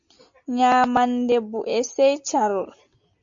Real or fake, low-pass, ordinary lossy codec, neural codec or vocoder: real; 7.2 kHz; AAC, 64 kbps; none